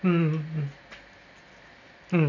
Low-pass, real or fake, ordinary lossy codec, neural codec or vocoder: 7.2 kHz; real; none; none